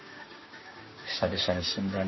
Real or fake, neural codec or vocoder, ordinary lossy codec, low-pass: fake; codec, 16 kHz, 6 kbps, DAC; MP3, 24 kbps; 7.2 kHz